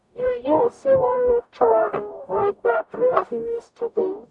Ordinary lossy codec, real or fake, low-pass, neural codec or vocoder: none; fake; 10.8 kHz; codec, 44.1 kHz, 0.9 kbps, DAC